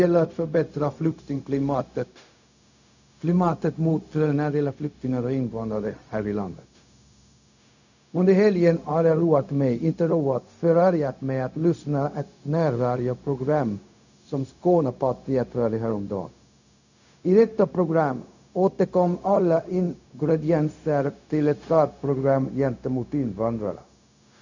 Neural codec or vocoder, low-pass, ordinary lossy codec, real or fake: codec, 16 kHz, 0.4 kbps, LongCat-Audio-Codec; 7.2 kHz; none; fake